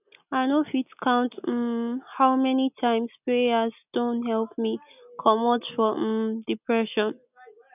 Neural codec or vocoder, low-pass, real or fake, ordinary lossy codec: none; 3.6 kHz; real; none